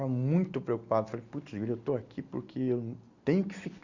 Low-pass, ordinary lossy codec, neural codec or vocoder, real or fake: 7.2 kHz; Opus, 64 kbps; none; real